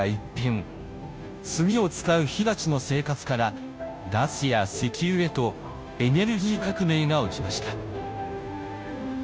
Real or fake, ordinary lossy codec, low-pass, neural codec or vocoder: fake; none; none; codec, 16 kHz, 0.5 kbps, FunCodec, trained on Chinese and English, 25 frames a second